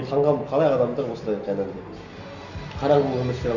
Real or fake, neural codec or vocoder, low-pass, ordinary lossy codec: real; none; 7.2 kHz; none